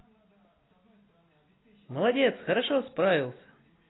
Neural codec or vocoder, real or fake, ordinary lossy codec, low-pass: none; real; AAC, 16 kbps; 7.2 kHz